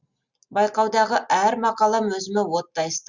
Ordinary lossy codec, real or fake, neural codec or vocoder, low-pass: Opus, 64 kbps; real; none; 7.2 kHz